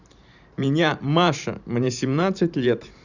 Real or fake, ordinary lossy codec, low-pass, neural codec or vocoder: fake; Opus, 64 kbps; 7.2 kHz; vocoder, 44.1 kHz, 128 mel bands every 512 samples, BigVGAN v2